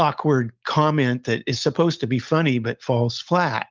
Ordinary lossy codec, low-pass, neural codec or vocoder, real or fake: Opus, 24 kbps; 7.2 kHz; none; real